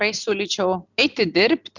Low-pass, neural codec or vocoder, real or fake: 7.2 kHz; none; real